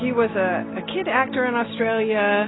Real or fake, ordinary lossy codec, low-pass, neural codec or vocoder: real; AAC, 16 kbps; 7.2 kHz; none